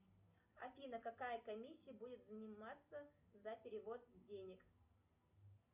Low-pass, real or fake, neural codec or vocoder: 3.6 kHz; real; none